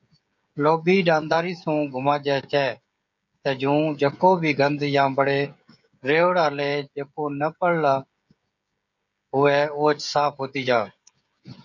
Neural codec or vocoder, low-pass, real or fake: codec, 16 kHz, 16 kbps, FreqCodec, smaller model; 7.2 kHz; fake